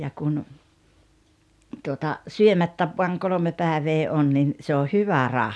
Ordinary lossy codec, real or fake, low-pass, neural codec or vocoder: none; real; none; none